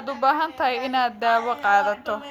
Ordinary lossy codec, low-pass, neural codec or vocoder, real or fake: Opus, 64 kbps; 19.8 kHz; none; real